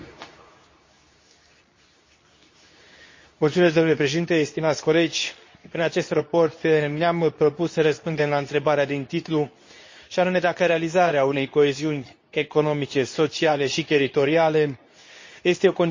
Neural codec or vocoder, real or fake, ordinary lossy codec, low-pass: codec, 24 kHz, 0.9 kbps, WavTokenizer, medium speech release version 2; fake; MP3, 32 kbps; 7.2 kHz